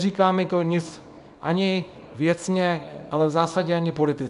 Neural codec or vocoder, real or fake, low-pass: codec, 24 kHz, 0.9 kbps, WavTokenizer, small release; fake; 10.8 kHz